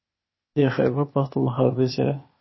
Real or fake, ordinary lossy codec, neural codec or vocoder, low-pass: fake; MP3, 24 kbps; codec, 16 kHz, 0.8 kbps, ZipCodec; 7.2 kHz